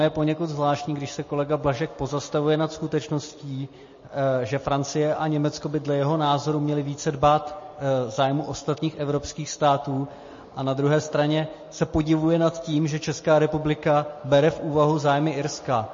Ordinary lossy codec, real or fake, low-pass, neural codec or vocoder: MP3, 32 kbps; real; 7.2 kHz; none